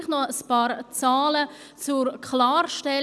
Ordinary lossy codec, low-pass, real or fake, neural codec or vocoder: none; none; real; none